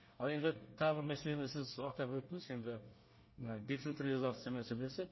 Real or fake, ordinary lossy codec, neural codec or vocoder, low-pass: fake; MP3, 24 kbps; codec, 24 kHz, 1 kbps, SNAC; 7.2 kHz